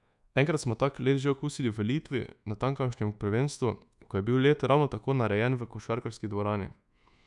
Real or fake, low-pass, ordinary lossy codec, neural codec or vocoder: fake; 10.8 kHz; none; codec, 24 kHz, 1.2 kbps, DualCodec